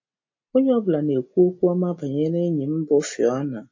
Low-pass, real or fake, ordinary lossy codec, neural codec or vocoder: 7.2 kHz; real; MP3, 32 kbps; none